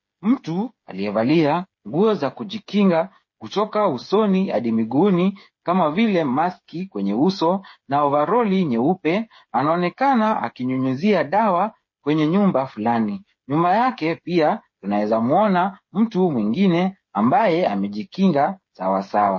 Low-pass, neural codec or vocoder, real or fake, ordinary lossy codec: 7.2 kHz; codec, 16 kHz, 8 kbps, FreqCodec, smaller model; fake; MP3, 32 kbps